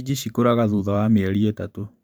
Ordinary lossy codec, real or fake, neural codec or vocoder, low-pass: none; fake; vocoder, 44.1 kHz, 128 mel bands every 512 samples, BigVGAN v2; none